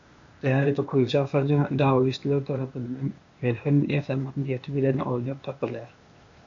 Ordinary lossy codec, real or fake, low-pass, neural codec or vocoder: MP3, 64 kbps; fake; 7.2 kHz; codec, 16 kHz, 0.8 kbps, ZipCodec